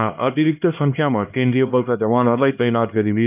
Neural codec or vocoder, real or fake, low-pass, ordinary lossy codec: codec, 16 kHz, 1 kbps, X-Codec, HuBERT features, trained on LibriSpeech; fake; 3.6 kHz; none